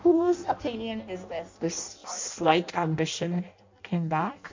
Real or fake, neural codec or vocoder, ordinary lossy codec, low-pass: fake; codec, 16 kHz in and 24 kHz out, 0.6 kbps, FireRedTTS-2 codec; MP3, 48 kbps; 7.2 kHz